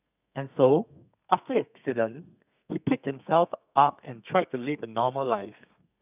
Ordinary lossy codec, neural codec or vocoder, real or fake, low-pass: none; codec, 32 kHz, 1.9 kbps, SNAC; fake; 3.6 kHz